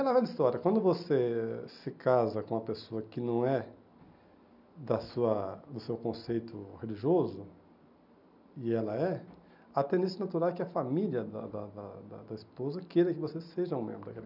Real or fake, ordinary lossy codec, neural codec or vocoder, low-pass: real; none; none; 5.4 kHz